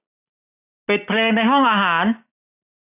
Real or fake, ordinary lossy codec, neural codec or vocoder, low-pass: real; none; none; 3.6 kHz